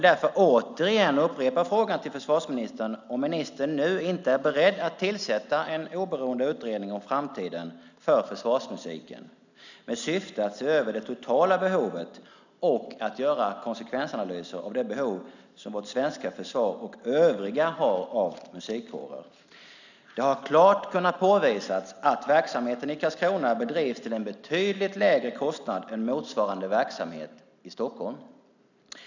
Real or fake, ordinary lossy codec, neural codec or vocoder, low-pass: real; none; none; 7.2 kHz